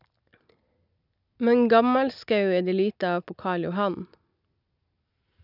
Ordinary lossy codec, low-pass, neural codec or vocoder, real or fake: none; 5.4 kHz; none; real